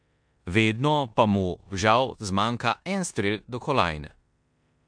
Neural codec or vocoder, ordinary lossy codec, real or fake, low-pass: codec, 16 kHz in and 24 kHz out, 0.9 kbps, LongCat-Audio-Codec, four codebook decoder; MP3, 64 kbps; fake; 9.9 kHz